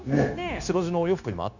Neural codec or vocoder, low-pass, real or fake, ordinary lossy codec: codec, 16 kHz, 0.9 kbps, LongCat-Audio-Codec; 7.2 kHz; fake; none